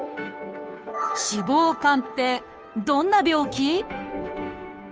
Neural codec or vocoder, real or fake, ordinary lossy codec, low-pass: codec, 16 kHz, 2 kbps, FunCodec, trained on Chinese and English, 25 frames a second; fake; none; none